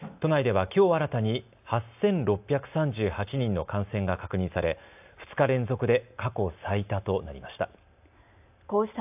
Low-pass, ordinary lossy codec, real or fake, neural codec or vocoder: 3.6 kHz; AAC, 32 kbps; real; none